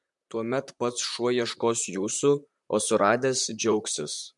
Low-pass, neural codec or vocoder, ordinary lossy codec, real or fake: 10.8 kHz; vocoder, 44.1 kHz, 128 mel bands, Pupu-Vocoder; MP3, 64 kbps; fake